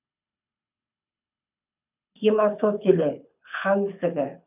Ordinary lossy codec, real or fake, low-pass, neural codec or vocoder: none; fake; 3.6 kHz; codec, 24 kHz, 6 kbps, HILCodec